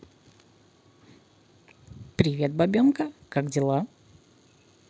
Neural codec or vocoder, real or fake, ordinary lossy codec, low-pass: none; real; none; none